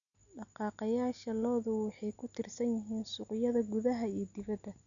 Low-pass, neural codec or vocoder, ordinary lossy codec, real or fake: 7.2 kHz; none; none; real